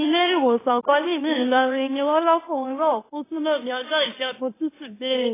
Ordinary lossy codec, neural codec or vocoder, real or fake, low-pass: AAC, 16 kbps; autoencoder, 44.1 kHz, a latent of 192 numbers a frame, MeloTTS; fake; 3.6 kHz